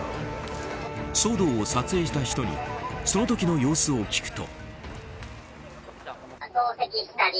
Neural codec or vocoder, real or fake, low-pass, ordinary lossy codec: none; real; none; none